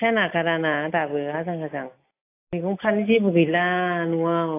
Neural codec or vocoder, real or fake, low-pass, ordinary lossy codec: none; real; 3.6 kHz; AAC, 24 kbps